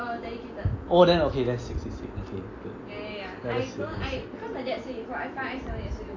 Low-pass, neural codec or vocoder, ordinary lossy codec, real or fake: 7.2 kHz; none; AAC, 32 kbps; real